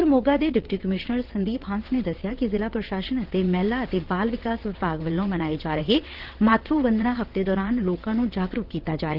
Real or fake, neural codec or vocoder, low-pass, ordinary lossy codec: fake; vocoder, 22.05 kHz, 80 mel bands, WaveNeXt; 5.4 kHz; Opus, 16 kbps